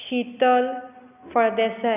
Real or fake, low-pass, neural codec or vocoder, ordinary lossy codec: real; 3.6 kHz; none; none